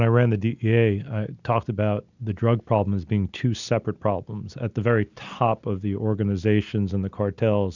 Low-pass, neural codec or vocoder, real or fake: 7.2 kHz; none; real